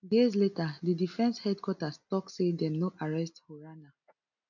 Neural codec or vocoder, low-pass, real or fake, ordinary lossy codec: none; 7.2 kHz; real; none